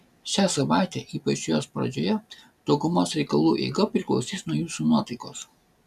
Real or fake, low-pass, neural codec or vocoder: real; 14.4 kHz; none